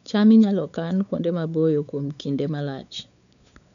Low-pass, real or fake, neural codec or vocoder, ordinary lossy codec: 7.2 kHz; fake; codec, 16 kHz, 4 kbps, X-Codec, WavLM features, trained on Multilingual LibriSpeech; none